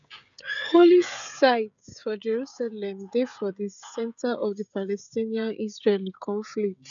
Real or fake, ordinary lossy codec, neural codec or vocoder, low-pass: fake; none; codec, 16 kHz, 16 kbps, FreqCodec, smaller model; 7.2 kHz